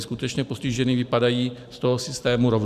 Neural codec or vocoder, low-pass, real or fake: vocoder, 44.1 kHz, 128 mel bands every 512 samples, BigVGAN v2; 14.4 kHz; fake